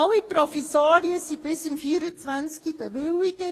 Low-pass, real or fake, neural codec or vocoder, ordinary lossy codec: 14.4 kHz; fake; codec, 44.1 kHz, 2.6 kbps, DAC; AAC, 48 kbps